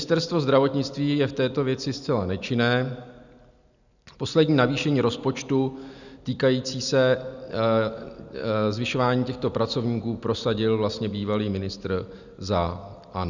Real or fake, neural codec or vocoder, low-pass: real; none; 7.2 kHz